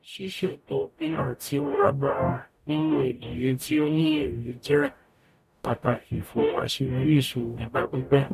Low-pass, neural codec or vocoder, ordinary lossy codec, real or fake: 14.4 kHz; codec, 44.1 kHz, 0.9 kbps, DAC; none; fake